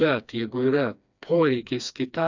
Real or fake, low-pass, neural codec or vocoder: fake; 7.2 kHz; codec, 16 kHz, 2 kbps, FreqCodec, smaller model